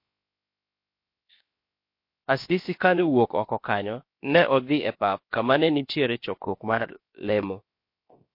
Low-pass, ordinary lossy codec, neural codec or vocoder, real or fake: 5.4 kHz; MP3, 32 kbps; codec, 16 kHz, 0.7 kbps, FocalCodec; fake